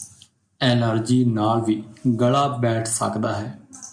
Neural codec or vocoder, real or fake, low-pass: none; real; 9.9 kHz